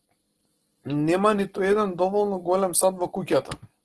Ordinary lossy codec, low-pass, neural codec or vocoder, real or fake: Opus, 16 kbps; 10.8 kHz; none; real